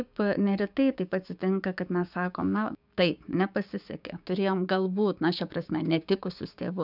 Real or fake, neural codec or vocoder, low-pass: fake; codec, 16 kHz, 6 kbps, DAC; 5.4 kHz